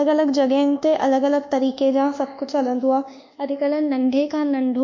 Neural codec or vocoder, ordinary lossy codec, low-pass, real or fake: codec, 24 kHz, 1.2 kbps, DualCodec; MP3, 48 kbps; 7.2 kHz; fake